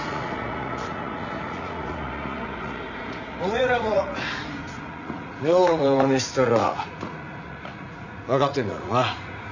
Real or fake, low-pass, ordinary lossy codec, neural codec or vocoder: fake; 7.2 kHz; none; vocoder, 44.1 kHz, 80 mel bands, Vocos